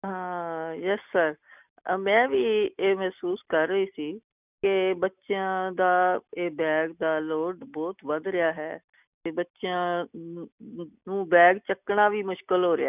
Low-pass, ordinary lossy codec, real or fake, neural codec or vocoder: 3.6 kHz; none; real; none